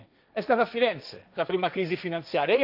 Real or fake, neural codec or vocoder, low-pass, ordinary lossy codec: fake; codec, 16 kHz, 1.1 kbps, Voila-Tokenizer; 5.4 kHz; none